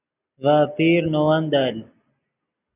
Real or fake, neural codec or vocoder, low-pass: real; none; 3.6 kHz